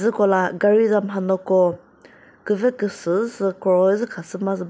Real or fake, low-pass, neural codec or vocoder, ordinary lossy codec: real; none; none; none